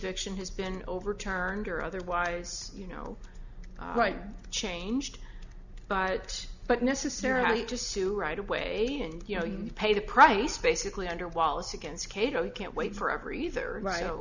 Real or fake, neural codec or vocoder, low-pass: real; none; 7.2 kHz